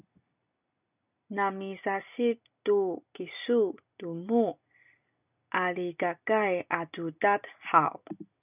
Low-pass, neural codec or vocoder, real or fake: 3.6 kHz; none; real